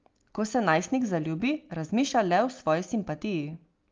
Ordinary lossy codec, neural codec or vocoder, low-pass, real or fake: Opus, 32 kbps; none; 7.2 kHz; real